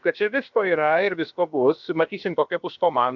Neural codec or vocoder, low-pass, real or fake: codec, 16 kHz, about 1 kbps, DyCAST, with the encoder's durations; 7.2 kHz; fake